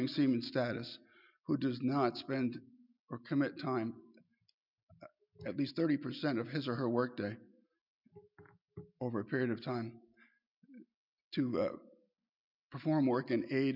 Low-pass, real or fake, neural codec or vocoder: 5.4 kHz; real; none